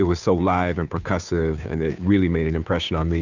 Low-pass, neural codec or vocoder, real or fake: 7.2 kHz; codec, 16 kHz, 2 kbps, FunCodec, trained on Chinese and English, 25 frames a second; fake